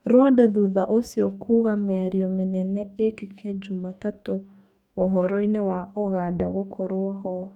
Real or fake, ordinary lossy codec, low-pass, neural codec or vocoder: fake; none; 19.8 kHz; codec, 44.1 kHz, 2.6 kbps, DAC